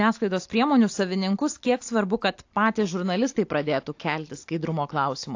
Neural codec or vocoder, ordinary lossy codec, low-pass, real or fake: none; AAC, 48 kbps; 7.2 kHz; real